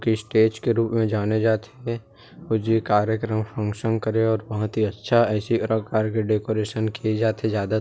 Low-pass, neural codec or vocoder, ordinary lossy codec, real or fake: none; none; none; real